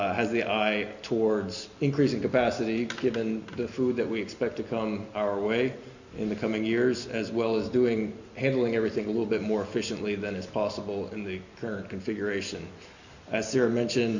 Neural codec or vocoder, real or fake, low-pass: none; real; 7.2 kHz